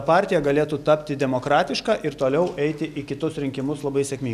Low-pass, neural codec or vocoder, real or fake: 14.4 kHz; none; real